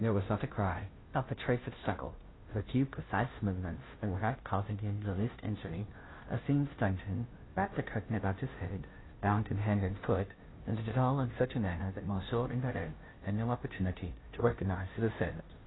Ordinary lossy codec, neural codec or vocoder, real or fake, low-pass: AAC, 16 kbps; codec, 16 kHz, 0.5 kbps, FunCodec, trained on Chinese and English, 25 frames a second; fake; 7.2 kHz